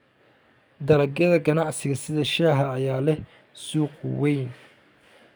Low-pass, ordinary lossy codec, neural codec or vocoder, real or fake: none; none; codec, 44.1 kHz, 7.8 kbps, DAC; fake